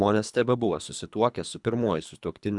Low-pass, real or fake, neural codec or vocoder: 10.8 kHz; fake; codec, 24 kHz, 3 kbps, HILCodec